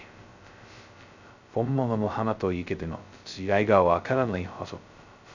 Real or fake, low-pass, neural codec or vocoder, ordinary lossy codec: fake; 7.2 kHz; codec, 16 kHz, 0.2 kbps, FocalCodec; none